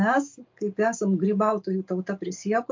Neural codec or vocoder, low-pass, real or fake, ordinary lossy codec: none; 7.2 kHz; real; MP3, 48 kbps